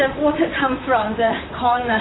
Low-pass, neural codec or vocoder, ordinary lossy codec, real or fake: 7.2 kHz; vocoder, 44.1 kHz, 128 mel bands, Pupu-Vocoder; AAC, 16 kbps; fake